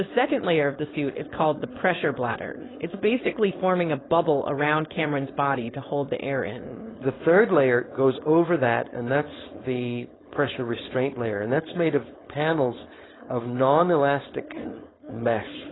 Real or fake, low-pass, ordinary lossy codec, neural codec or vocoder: fake; 7.2 kHz; AAC, 16 kbps; codec, 16 kHz, 4.8 kbps, FACodec